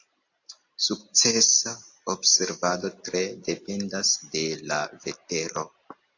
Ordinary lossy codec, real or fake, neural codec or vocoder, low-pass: AAC, 48 kbps; real; none; 7.2 kHz